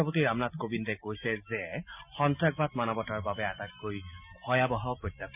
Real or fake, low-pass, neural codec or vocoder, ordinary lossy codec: real; 3.6 kHz; none; none